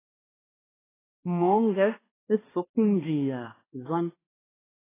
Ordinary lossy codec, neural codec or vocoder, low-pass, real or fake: AAC, 16 kbps; codec, 16 kHz, 2 kbps, X-Codec, HuBERT features, trained on balanced general audio; 3.6 kHz; fake